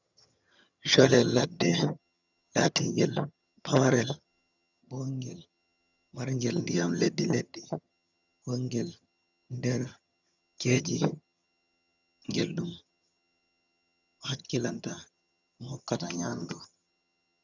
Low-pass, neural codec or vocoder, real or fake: 7.2 kHz; vocoder, 22.05 kHz, 80 mel bands, HiFi-GAN; fake